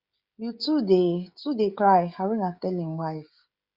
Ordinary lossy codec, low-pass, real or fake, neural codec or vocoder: Opus, 64 kbps; 5.4 kHz; fake; codec, 16 kHz, 16 kbps, FreqCodec, smaller model